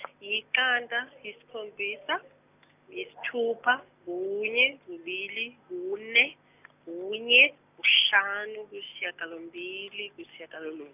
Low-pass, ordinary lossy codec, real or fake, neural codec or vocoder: 3.6 kHz; none; real; none